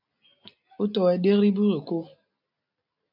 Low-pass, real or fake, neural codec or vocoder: 5.4 kHz; real; none